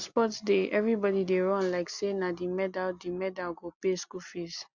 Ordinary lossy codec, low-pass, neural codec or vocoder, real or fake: Opus, 64 kbps; 7.2 kHz; none; real